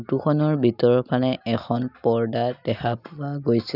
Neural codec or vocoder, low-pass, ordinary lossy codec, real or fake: none; 5.4 kHz; none; real